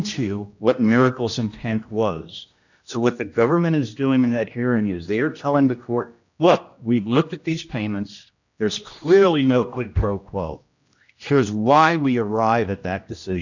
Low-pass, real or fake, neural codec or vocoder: 7.2 kHz; fake; codec, 16 kHz, 1 kbps, X-Codec, HuBERT features, trained on general audio